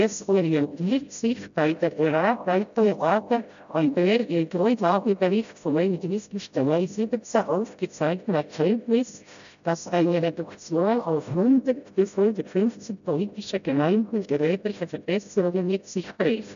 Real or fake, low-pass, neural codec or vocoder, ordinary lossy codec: fake; 7.2 kHz; codec, 16 kHz, 0.5 kbps, FreqCodec, smaller model; AAC, 96 kbps